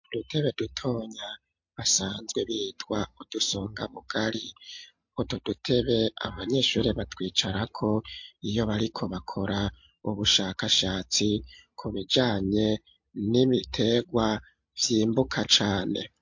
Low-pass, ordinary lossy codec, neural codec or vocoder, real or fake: 7.2 kHz; MP3, 48 kbps; none; real